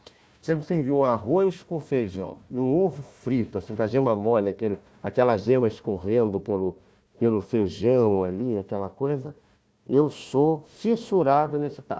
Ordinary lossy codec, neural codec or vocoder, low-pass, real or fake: none; codec, 16 kHz, 1 kbps, FunCodec, trained on Chinese and English, 50 frames a second; none; fake